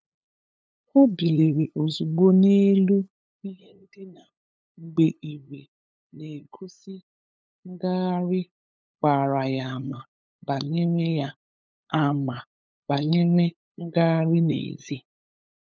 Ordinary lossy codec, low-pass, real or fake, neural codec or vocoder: none; none; fake; codec, 16 kHz, 8 kbps, FunCodec, trained on LibriTTS, 25 frames a second